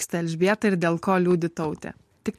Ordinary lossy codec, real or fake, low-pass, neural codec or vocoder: MP3, 64 kbps; real; 14.4 kHz; none